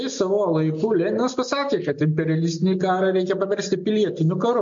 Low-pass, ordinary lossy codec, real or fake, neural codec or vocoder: 7.2 kHz; MP3, 48 kbps; real; none